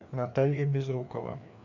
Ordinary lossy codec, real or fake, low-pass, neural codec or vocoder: AAC, 48 kbps; fake; 7.2 kHz; codec, 16 kHz, 2 kbps, FreqCodec, larger model